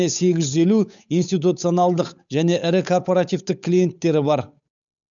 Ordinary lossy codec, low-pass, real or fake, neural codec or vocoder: none; 7.2 kHz; fake; codec, 16 kHz, 8 kbps, FunCodec, trained on Chinese and English, 25 frames a second